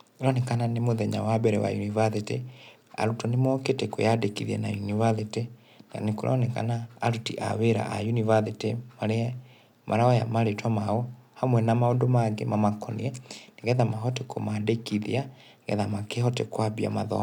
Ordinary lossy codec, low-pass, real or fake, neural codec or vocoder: none; 19.8 kHz; real; none